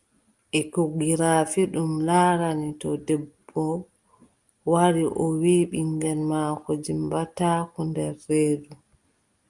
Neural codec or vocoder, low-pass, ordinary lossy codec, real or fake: none; 10.8 kHz; Opus, 32 kbps; real